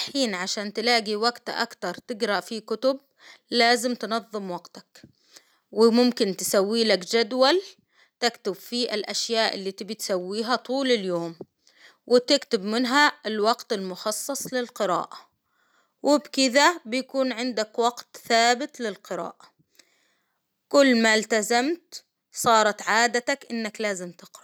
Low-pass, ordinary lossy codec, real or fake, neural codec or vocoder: none; none; real; none